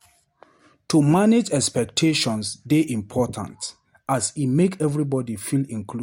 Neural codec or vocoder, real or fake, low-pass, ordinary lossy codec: none; real; 19.8 kHz; MP3, 64 kbps